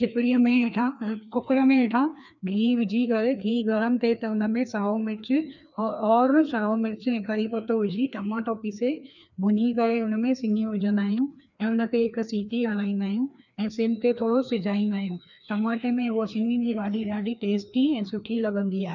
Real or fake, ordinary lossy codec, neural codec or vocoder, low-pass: fake; none; codec, 16 kHz, 2 kbps, FreqCodec, larger model; 7.2 kHz